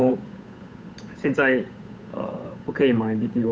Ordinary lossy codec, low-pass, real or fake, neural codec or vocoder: none; none; fake; codec, 16 kHz, 8 kbps, FunCodec, trained on Chinese and English, 25 frames a second